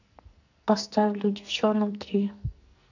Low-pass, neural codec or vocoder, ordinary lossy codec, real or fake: 7.2 kHz; codec, 44.1 kHz, 2.6 kbps, SNAC; none; fake